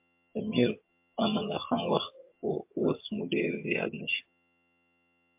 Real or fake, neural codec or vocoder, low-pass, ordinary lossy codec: fake; vocoder, 22.05 kHz, 80 mel bands, HiFi-GAN; 3.6 kHz; MP3, 32 kbps